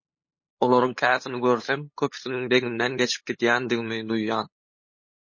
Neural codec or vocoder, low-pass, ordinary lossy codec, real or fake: codec, 16 kHz, 8 kbps, FunCodec, trained on LibriTTS, 25 frames a second; 7.2 kHz; MP3, 32 kbps; fake